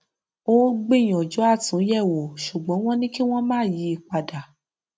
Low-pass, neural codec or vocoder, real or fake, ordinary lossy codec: none; none; real; none